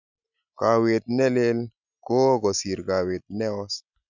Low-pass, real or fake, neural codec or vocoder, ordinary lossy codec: 7.2 kHz; real; none; none